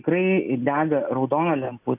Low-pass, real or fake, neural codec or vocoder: 3.6 kHz; real; none